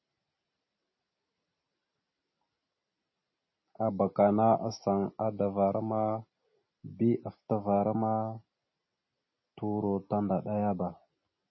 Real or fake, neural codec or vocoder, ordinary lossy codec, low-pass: real; none; MP3, 24 kbps; 7.2 kHz